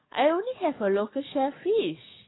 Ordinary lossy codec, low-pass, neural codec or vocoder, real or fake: AAC, 16 kbps; 7.2 kHz; codec, 44.1 kHz, 7.8 kbps, DAC; fake